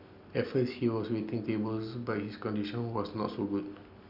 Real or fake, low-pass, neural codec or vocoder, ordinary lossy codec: real; 5.4 kHz; none; none